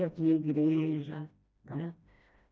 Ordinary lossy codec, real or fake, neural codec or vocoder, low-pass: none; fake; codec, 16 kHz, 1 kbps, FreqCodec, smaller model; none